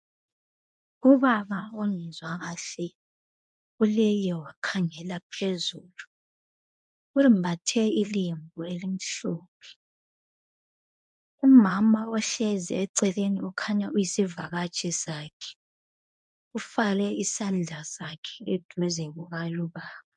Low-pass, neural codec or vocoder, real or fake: 10.8 kHz; codec, 24 kHz, 0.9 kbps, WavTokenizer, medium speech release version 1; fake